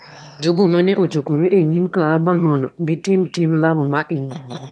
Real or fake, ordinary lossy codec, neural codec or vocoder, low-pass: fake; none; autoencoder, 22.05 kHz, a latent of 192 numbers a frame, VITS, trained on one speaker; none